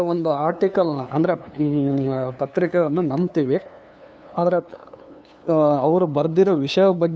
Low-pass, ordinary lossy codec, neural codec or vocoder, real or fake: none; none; codec, 16 kHz, 2 kbps, FunCodec, trained on LibriTTS, 25 frames a second; fake